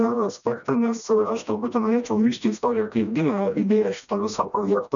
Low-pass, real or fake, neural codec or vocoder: 7.2 kHz; fake; codec, 16 kHz, 1 kbps, FreqCodec, smaller model